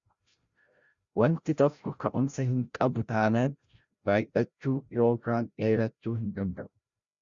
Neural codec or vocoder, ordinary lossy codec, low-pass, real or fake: codec, 16 kHz, 0.5 kbps, FreqCodec, larger model; Opus, 64 kbps; 7.2 kHz; fake